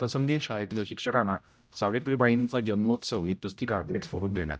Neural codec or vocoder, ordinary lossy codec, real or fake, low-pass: codec, 16 kHz, 0.5 kbps, X-Codec, HuBERT features, trained on general audio; none; fake; none